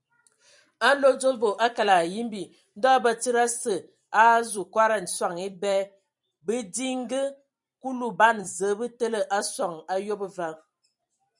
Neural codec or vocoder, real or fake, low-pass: vocoder, 44.1 kHz, 128 mel bands every 256 samples, BigVGAN v2; fake; 10.8 kHz